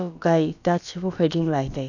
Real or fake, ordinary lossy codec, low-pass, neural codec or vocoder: fake; none; 7.2 kHz; codec, 16 kHz, about 1 kbps, DyCAST, with the encoder's durations